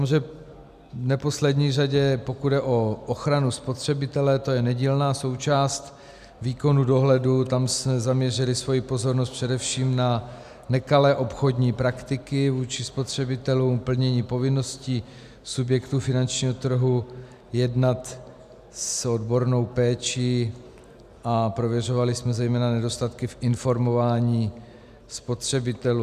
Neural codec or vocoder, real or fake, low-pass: none; real; 14.4 kHz